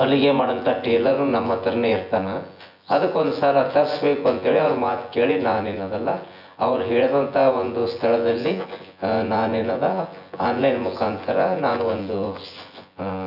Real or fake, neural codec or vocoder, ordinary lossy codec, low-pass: fake; vocoder, 24 kHz, 100 mel bands, Vocos; none; 5.4 kHz